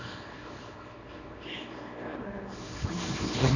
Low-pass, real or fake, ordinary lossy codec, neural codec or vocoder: 7.2 kHz; fake; none; codec, 24 kHz, 0.9 kbps, WavTokenizer, small release